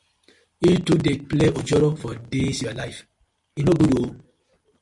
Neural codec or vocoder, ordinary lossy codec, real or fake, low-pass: none; MP3, 48 kbps; real; 10.8 kHz